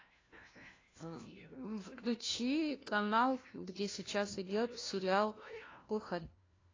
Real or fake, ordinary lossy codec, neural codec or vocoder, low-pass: fake; AAC, 32 kbps; codec, 16 kHz, 1 kbps, FunCodec, trained on LibriTTS, 50 frames a second; 7.2 kHz